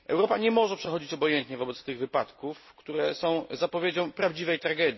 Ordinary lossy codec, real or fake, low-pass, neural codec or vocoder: MP3, 24 kbps; real; 7.2 kHz; none